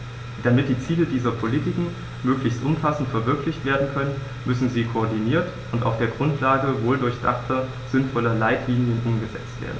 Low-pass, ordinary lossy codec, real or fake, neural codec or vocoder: none; none; real; none